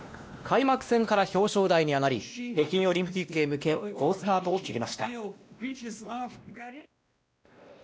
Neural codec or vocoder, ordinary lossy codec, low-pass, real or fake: codec, 16 kHz, 1 kbps, X-Codec, WavLM features, trained on Multilingual LibriSpeech; none; none; fake